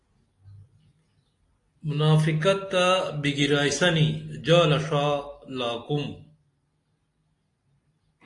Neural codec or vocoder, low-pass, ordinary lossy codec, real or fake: none; 10.8 kHz; AAC, 48 kbps; real